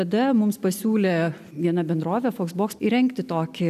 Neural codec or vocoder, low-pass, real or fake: none; 14.4 kHz; real